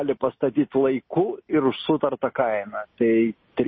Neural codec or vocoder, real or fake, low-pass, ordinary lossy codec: none; real; 7.2 kHz; MP3, 24 kbps